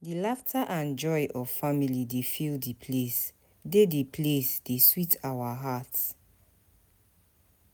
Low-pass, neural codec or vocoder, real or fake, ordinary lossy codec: none; none; real; none